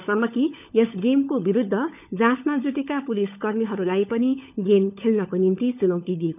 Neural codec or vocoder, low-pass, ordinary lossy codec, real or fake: codec, 16 kHz, 16 kbps, FunCodec, trained on LibriTTS, 50 frames a second; 3.6 kHz; none; fake